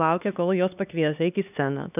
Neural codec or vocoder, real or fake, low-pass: codec, 16 kHz, 2 kbps, X-Codec, HuBERT features, trained on LibriSpeech; fake; 3.6 kHz